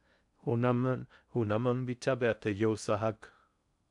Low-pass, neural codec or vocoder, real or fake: 10.8 kHz; codec, 16 kHz in and 24 kHz out, 0.6 kbps, FocalCodec, streaming, 2048 codes; fake